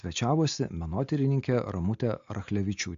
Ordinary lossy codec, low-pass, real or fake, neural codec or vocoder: AAC, 64 kbps; 7.2 kHz; real; none